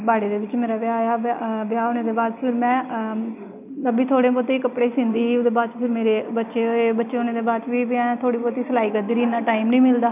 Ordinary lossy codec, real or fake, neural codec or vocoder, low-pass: none; real; none; 3.6 kHz